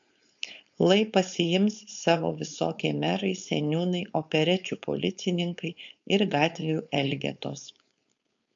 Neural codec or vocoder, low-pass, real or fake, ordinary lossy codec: codec, 16 kHz, 4.8 kbps, FACodec; 7.2 kHz; fake; MP3, 64 kbps